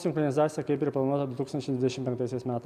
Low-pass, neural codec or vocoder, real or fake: 14.4 kHz; none; real